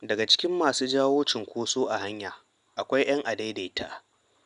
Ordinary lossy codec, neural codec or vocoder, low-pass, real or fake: none; none; 10.8 kHz; real